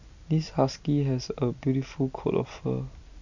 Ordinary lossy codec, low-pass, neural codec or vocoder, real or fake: none; 7.2 kHz; none; real